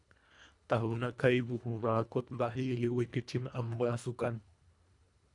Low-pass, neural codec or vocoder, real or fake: 10.8 kHz; codec, 24 kHz, 1.5 kbps, HILCodec; fake